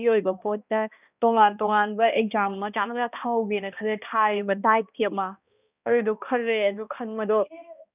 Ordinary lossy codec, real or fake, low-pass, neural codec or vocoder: none; fake; 3.6 kHz; codec, 16 kHz, 1 kbps, X-Codec, HuBERT features, trained on balanced general audio